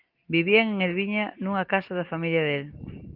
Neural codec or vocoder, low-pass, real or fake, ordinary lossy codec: none; 5.4 kHz; real; Opus, 32 kbps